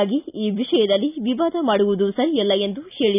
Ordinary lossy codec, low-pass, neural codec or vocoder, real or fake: none; 3.6 kHz; none; real